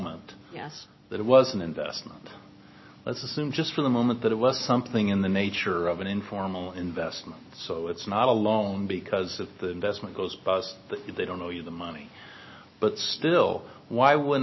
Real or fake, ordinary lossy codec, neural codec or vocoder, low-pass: real; MP3, 24 kbps; none; 7.2 kHz